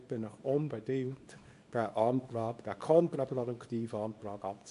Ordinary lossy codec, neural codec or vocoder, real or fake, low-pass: AAC, 96 kbps; codec, 24 kHz, 0.9 kbps, WavTokenizer, small release; fake; 10.8 kHz